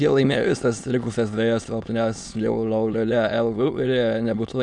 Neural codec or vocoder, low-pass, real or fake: autoencoder, 22.05 kHz, a latent of 192 numbers a frame, VITS, trained on many speakers; 9.9 kHz; fake